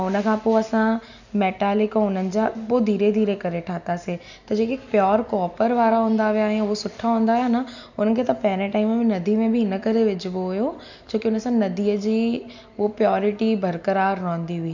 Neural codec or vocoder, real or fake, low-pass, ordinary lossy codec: none; real; 7.2 kHz; none